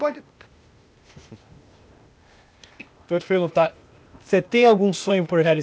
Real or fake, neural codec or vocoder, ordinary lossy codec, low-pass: fake; codec, 16 kHz, 0.8 kbps, ZipCodec; none; none